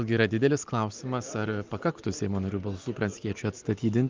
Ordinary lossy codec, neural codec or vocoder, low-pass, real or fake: Opus, 24 kbps; none; 7.2 kHz; real